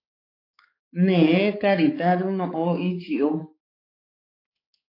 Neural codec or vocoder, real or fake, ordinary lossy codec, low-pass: codec, 16 kHz, 4 kbps, X-Codec, HuBERT features, trained on balanced general audio; fake; AAC, 32 kbps; 5.4 kHz